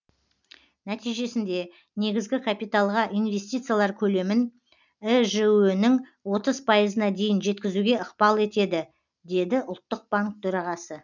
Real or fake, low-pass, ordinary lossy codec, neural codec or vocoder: real; 7.2 kHz; none; none